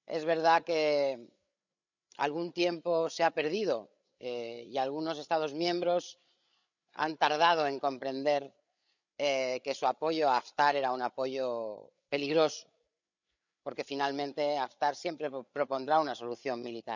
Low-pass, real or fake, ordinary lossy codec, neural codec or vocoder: 7.2 kHz; fake; none; codec, 16 kHz, 8 kbps, FreqCodec, larger model